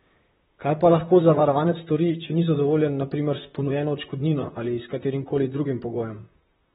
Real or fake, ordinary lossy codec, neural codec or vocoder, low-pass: fake; AAC, 16 kbps; vocoder, 44.1 kHz, 128 mel bands, Pupu-Vocoder; 19.8 kHz